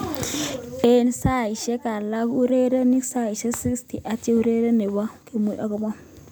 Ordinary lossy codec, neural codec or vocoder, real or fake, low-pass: none; none; real; none